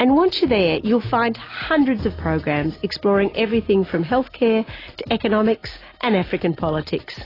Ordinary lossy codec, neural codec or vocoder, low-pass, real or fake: AAC, 24 kbps; none; 5.4 kHz; real